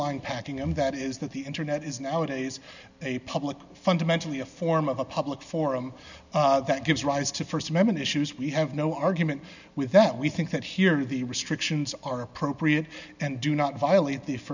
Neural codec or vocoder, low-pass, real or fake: none; 7.2 kHz; real